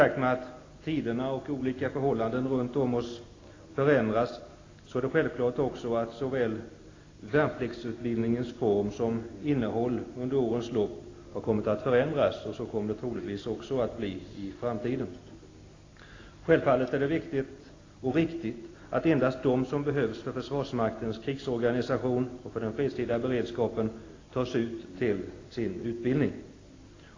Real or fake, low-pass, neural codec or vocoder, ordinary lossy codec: real; 7.2 kHz; none; AAC, 32 kbps